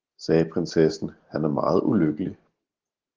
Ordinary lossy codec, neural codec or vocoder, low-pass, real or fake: Opus, 16 kbps; none; 7.2 kHz; real